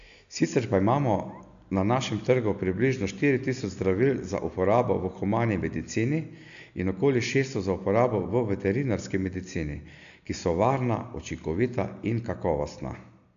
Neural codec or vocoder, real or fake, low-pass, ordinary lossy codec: none; real; 7.2 kHz; AAC, 96 kbps